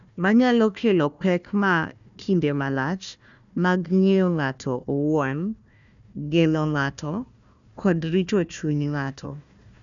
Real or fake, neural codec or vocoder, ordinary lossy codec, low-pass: fake; codec, 16 kHz, 1 kbps, FunCodec, trained on Chinese and English, 50 frames a second; none; 7.2 kHz